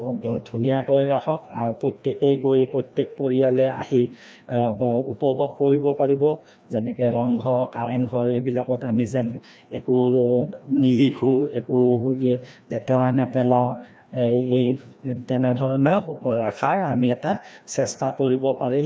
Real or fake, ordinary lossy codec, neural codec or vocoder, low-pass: fake; none; codec, 16 kHz, 1 kbps, FreqCodec, larger model; none